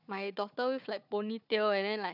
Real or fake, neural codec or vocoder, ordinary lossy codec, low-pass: fake; codec, 16 kHz, 4 kbps, FunCodec, trained on Chinese and English, 50 frames a second; none; 5.4 kHz